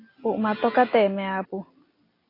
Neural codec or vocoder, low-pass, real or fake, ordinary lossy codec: none; 5.4 kHz; real; AAC, 24 kbps